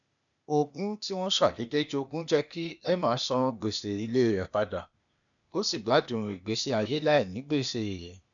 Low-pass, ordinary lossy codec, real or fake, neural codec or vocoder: 7.2 kHz; none; fake; codec, 16 kHz, 0.8 kbps, ZipCodec